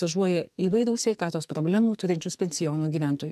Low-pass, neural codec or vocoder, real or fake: 14.4 kHz; codec, 44.1 kHz, 2.6 kbps, SNAC; fake